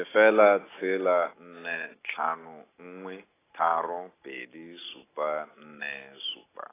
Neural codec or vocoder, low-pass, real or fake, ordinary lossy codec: none; 3.6 kHz; real; AAC, 16 kbps